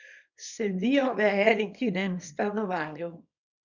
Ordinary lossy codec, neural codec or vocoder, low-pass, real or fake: none; codec, 24 kHz, 0.9 kbps, WavTokenizer, small release; 7.2 kHz; fake